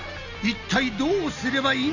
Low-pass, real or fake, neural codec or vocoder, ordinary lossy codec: 7.2 kHz; real; none; none